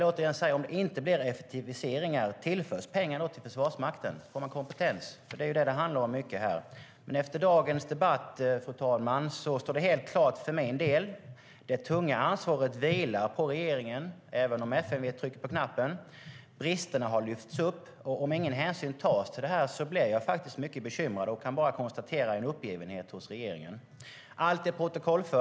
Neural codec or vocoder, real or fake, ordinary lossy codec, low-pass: none; real; none; none